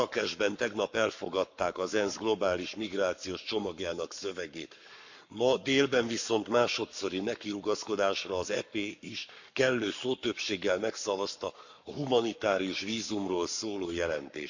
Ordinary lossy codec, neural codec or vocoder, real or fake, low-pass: none; codec, 44.1 kHz, 7.8 kbps, Pupu-Codec; fake; 7.2 kHz